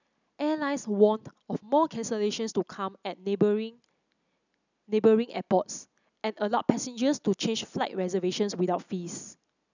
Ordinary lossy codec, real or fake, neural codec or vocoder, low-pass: none; real; none; 7.2 kHz